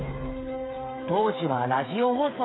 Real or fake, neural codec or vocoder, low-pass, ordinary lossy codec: fake; codec, 16 kHz, 8 kbps, FreqCodec, smaller model; 7.2 kHz; AAC, 16 kbps